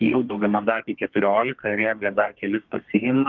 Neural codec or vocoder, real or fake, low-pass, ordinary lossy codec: codec, 32 kHz, 1.9 kbps, SNAC; fake; 7.2 kHz; Opus, 24 kbps